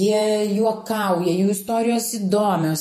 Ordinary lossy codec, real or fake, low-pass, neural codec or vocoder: MP3, 64 kbps; fake; 14.4 kHz; vocoder, 48 kHz, 128 mel bands, Vocos